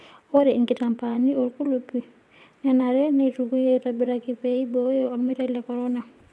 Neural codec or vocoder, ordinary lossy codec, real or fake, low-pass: vocoder, 22.05 kHz, 80 mel bands, WaveNeXt; none; fake; none